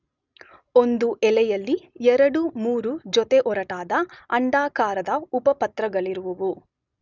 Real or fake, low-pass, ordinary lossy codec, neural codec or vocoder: real; 7.2 kHz; none; none